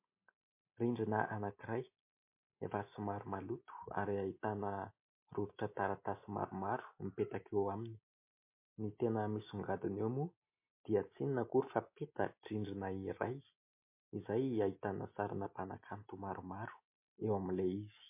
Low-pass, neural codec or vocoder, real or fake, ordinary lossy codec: 3.6 kHz; none; real; MP3, 24 kbps